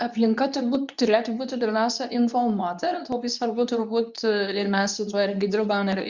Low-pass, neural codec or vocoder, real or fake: 7.2 kHz; codec, 24 kHz, 0.9 kbps, WavTokenizer, medium speech release version 2; fake